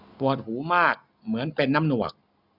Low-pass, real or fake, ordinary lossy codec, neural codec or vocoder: 5.4 kHz; real; none; none